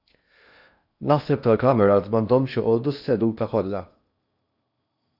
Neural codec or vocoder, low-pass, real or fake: codec, 16 kHz in and 24 kHz out, 0.8 kbps, FocalCodec, streaming, 65536 codes; 5.4 kHz; fake